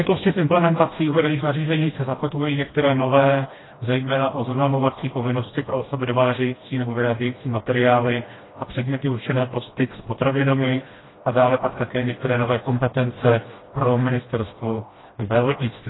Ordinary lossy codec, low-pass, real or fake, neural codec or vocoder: AAC, 16 kbps; 7.2 kHz; fake; codec, 16 kHz, 1 kbps, FreqCodec, smaller model